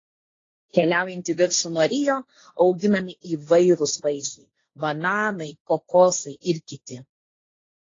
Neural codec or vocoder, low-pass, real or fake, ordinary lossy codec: codec, 16 kHz, 1.1 kbps, Voila-Tokenizer; 7.2 kHz; fake; AAC, 32 kbps